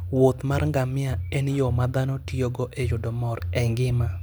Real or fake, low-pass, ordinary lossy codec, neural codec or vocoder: real; none; none; none